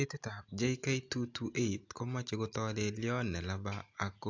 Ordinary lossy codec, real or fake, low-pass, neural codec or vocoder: AAC, 48 kbps; real; 7.2 kHz; none